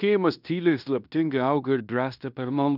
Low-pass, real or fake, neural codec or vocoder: 5.4 kHz; fake; codec, 16 kHz in and 24 kHz out, 0.9 kbps, LongCat-Audio-Codec, fine tuned four codebook decoder